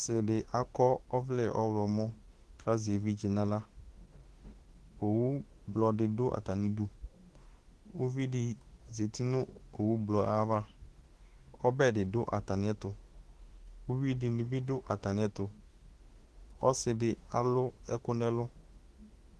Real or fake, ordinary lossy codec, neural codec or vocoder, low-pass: fake; Opus, 16 kbps; autoencoder, 48 kHz, 32 numbers a frame, DAC-VAE, trained on Japanese speech; 10.8 kHz